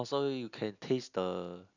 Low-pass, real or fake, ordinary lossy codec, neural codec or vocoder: 7.2 kHz; real; none; none